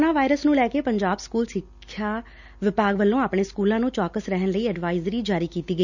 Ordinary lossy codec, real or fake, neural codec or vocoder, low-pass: none; real; none; 7.2 kHz